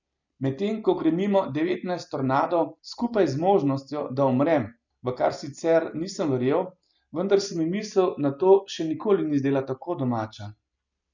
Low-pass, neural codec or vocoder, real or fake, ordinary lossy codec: 7.2 kHz; none; real; none